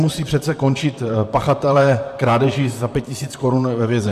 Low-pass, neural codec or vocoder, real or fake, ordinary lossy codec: 14.4 kHz; vocoder, 44.1 kHz, 128 mel bands, Pupu-Vocoder; fake; MP3, 96 kbps